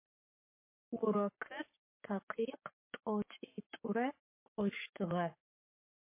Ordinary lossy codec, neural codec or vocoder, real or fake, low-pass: MP3, 24 kbps; codec, 44.1 kHz, 7.8 kbps, Pupu-Codec; fake; 3.6 kHz